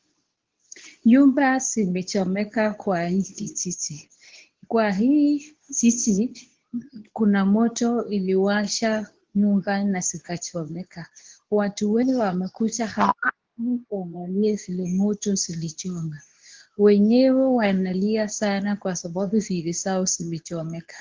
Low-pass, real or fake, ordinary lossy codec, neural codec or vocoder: 7.2 kHz; fake; Opus, 16 kbps; codec, 24 kHz, 0.9 kbps, WavTokenizer, medium speech release version 2